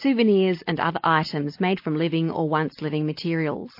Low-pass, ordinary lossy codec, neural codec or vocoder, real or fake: 5.4 kHz; MP3, 32 kbps; none; real